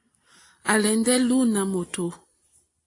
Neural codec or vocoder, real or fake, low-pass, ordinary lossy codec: none; real; 10.8 kHz; AAC, 48 kbps